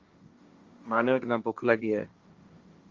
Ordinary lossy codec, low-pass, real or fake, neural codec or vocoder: Opus, 32 kbps; 7.2 kHz; fake; codec, 16 kHz, 1.1 kbps, Voila-Tokenizer